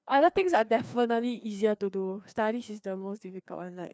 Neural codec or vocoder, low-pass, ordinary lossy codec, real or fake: codec, 16 kHz, 2 kbps, FreqCodec, larger model; none; none; fake